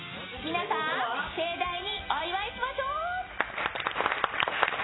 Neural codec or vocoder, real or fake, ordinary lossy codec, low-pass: none; real; AAC, 16 kbps; 7.2 kHz